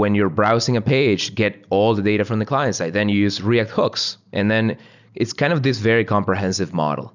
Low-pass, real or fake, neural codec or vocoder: 7.2 kHz; real; none